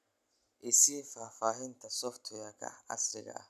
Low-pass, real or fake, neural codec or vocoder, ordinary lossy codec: 14.4 kHz; real; none; none